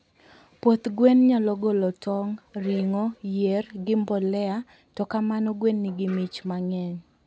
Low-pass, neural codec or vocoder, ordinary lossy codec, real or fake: none; none; none; real